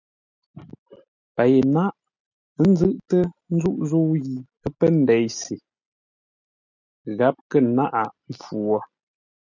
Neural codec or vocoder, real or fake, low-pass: none; real; 7.2 kHz